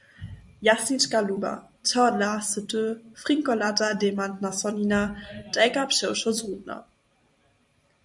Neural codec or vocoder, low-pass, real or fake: vocoder, 44.1 kHz, 128 mel bands every 256 samples, BigVGAN v2; 10.8 kHz; fake